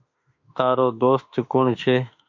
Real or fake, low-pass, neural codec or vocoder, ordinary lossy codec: fake; 7.2 kHz; autoencoder, 48 kHz, 32 numbers a frame, DAC-VAE, trained on Japanese speech; MP3, 64 kbps